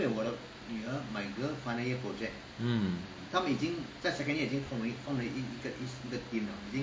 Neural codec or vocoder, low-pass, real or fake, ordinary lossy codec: none; none; real; none